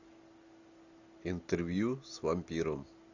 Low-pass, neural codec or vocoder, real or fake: 7.2 kHz; none; real